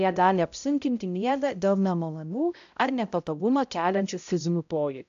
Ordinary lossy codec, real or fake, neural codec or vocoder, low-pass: AAC, 96 kbps; fake; codec, 16 kHz, 0.5 kbps, X-Codec, HuBERT features, trained on balanced general audio; 7.2 kHz